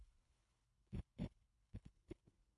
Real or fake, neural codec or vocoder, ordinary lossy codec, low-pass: fake; codec, 24 kHz, 3 kbps, HILCodec; MP3, 64 kbps; 10.8 kHz